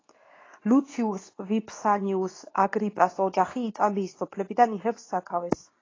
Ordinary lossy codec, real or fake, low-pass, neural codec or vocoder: AAC, 32 kbps; fake; 7.2 kHz; codec, 24 kHz, 0.9 kbps, WavTokenizer, medium speech release version 2